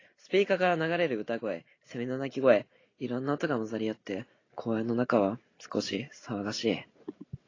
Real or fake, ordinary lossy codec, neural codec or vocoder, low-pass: real; AAC, 32 kbps; none; 7.2 kHz